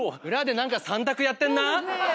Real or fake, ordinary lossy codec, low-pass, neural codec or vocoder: real; none; none; none